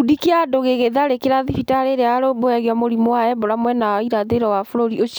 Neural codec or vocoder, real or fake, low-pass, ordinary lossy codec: none; real; none; none